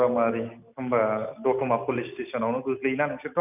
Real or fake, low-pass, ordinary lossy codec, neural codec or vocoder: real; 3.6 kHz; none; none